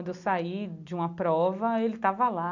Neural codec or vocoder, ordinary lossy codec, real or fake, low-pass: none; none; real; 7.2 kHz